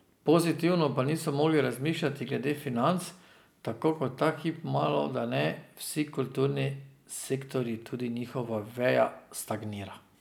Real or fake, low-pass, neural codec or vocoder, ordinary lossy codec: real; none; none; none